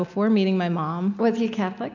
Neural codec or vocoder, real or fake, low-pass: none; real; 7.2 kHz